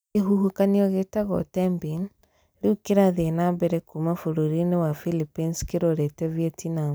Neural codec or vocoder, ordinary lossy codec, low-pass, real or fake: none; none; none; real